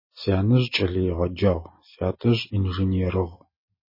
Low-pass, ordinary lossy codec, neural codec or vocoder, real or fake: 5.4 kHz; MP3, 24 kbps; none; real